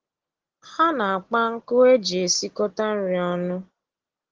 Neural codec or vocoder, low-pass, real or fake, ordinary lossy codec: none; 7.2 kHz; real; Opus, 16 kbps